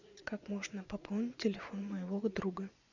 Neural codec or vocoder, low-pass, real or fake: vocoder, 24 kHz, 100 mel bands, Vocos; 7.2 kHz; fake